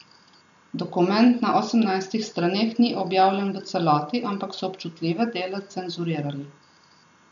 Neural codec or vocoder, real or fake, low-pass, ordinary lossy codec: none; real; 7.2 kHz; none